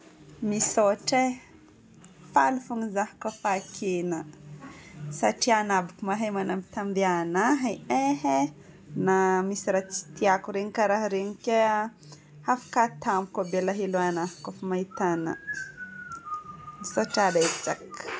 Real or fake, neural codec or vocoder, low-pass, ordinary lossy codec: real; none; none; none